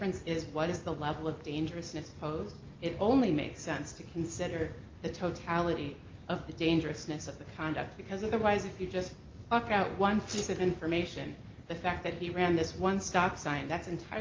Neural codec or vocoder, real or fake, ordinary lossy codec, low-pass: none; real; Opus, 24 kbps; 7.2 kHz